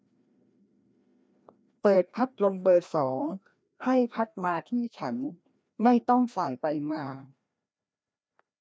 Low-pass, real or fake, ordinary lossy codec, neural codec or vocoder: none; fake; none; codec, 16 kHz, 1 kbps, FreqCodec, larger model